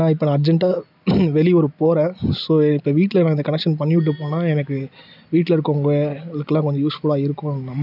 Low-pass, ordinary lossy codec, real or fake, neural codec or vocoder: 5.4 kHz; none; real; none